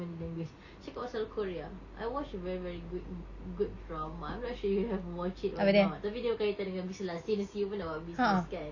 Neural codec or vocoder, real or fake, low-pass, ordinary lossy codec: none; real; 7.2 kHz; Opus, 64 kbps